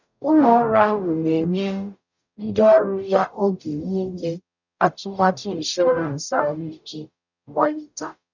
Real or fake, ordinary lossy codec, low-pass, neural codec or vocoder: fake; none; 7.2 kHz; codec, 44.1 kHz, 0.9 kbps, DAC